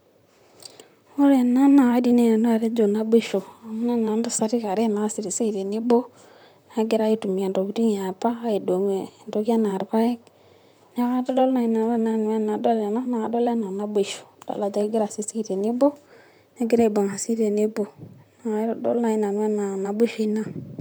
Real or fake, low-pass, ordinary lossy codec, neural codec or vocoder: fake; none; none; vocoder, 44.1 kHz, 128 mel bands, Pupu-Vocoder